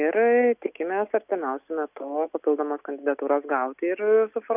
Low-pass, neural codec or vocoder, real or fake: 3.6 kHz; none; real